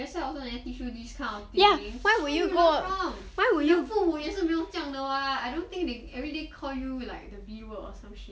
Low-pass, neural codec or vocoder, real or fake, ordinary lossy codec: none; none; real; none